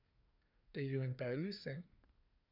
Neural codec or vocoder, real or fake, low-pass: codec, 24 kHz, 1 kbps, SNAC; fake; 5.4 kHz